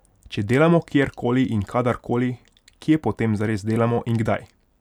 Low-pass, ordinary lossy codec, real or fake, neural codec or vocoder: 19.8 kHz; none; real; none